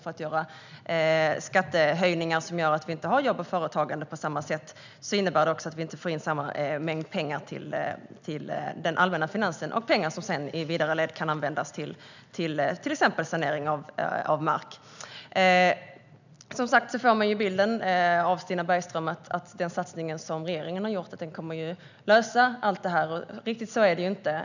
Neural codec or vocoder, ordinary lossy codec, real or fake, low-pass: none; none; real; 7.2 kHz